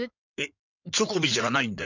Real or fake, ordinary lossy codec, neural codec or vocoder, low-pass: fake; none; codec, 16 kHz in and 24 kHz out, 2.2 kbps, FireRedTTS-2 codec; 7.2 kHz